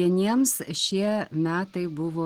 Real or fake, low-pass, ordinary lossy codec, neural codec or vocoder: real; 19.8 kHz; Opus, 16 kbps; none